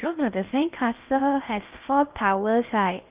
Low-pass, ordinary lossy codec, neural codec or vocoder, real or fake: 3.6 kHz; Opus, 24 kbps; codec, 16 kHz in and 24 kHz out, 0.6 kbps, FocalCodec, streaming, 4096 codes; fake